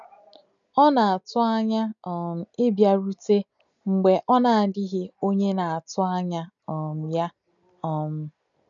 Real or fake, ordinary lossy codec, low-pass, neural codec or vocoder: real; none; 7.2 kHz; none